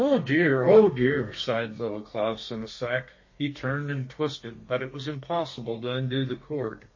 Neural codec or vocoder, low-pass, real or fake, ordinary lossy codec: codec, 32 kHz, 1.9 kbps, SNAC; 7.2 kHz; fake; MP3, 32 kbps